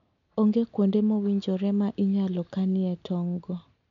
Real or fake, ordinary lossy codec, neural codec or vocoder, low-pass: real; none; none; 7.2 kHz